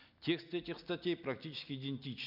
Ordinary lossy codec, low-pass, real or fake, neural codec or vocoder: none; 5.4 kHz; real; none